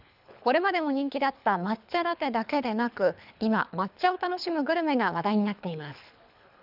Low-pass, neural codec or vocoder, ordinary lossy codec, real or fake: 5.4 kHz; codec, 24 kHz, 3 kbps, HILCodec; none; fake